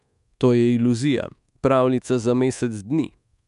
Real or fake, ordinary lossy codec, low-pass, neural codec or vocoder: fake; AAC, 96 kbps; 10.8 kHz; codec, 24 kHz, 1.2 kbps, DualCodec